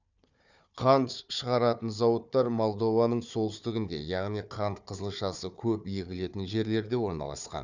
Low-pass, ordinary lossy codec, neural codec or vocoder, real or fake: 7.2 kHz; none; codec, 16 kHz, 4 kbps, FunCodec, trained on Chinese and English, 50 frames a second; fake